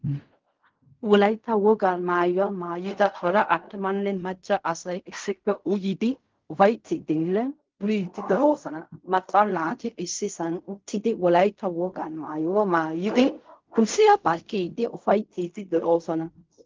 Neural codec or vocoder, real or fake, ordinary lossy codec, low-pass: codec, 16 kHz in and 24 kHz out, 0.4 kbps, LongCat-Audio-Codec, fine tuned four codebook decoder; fake; Opus, 32 kbps; 7.2 kHz